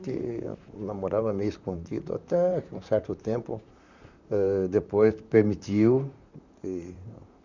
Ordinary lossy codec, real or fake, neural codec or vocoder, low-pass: none; fake; vocoder, 44.1 kHz, 128 mel bands, Pupu-Vocoder; 7.2 kHz